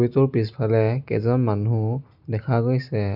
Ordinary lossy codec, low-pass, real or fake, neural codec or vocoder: none; 5.4 kHz; real; none